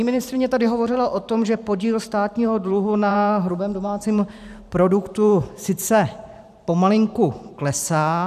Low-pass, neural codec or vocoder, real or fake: 14.4 kHz; vocoder, 44.1 kHz, 128 mel bands every 512 samples, BigVGAN v2; fake